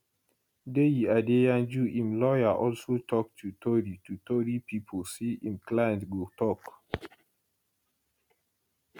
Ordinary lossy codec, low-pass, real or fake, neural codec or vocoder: none; 19.8 kHz; real; none